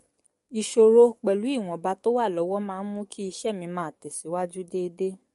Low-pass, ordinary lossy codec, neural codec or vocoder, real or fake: 14.4 kHz; MP3, 48 kbps; codec, 44.1 kHz, 7.8 kbps, DAC; fake